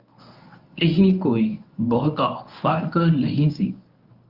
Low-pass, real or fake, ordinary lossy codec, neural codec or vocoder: 5.4 kHz; fake; Opus, 32 kbps; codec, 24 kHz, 0.9 kbps, WavTokenizer, medium speech release version 1